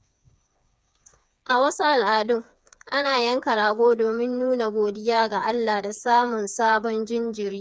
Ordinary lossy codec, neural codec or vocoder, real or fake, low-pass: none; codec, 16 kHz, 4 kbps, FreqCodec, smaller model; fake; none